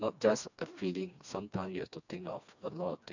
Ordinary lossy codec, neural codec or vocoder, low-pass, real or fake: none; codec, 16 kHz, 2 kbps, FreqCodec, smaller model; 7.2 kHz; fake